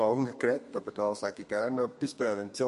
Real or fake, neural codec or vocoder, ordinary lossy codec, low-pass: fake; codec, 32 kHz, 1.9 kbps, SNAC; MP3, 48 kbps; 14.4 kHz